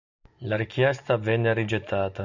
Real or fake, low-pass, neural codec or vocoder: real; 7.2 kHz; none